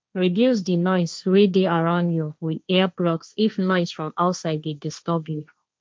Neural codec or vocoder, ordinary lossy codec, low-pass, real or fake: codec, 16 kHz, 1.1 kbps, Voila-Tokenizer; none; none; fake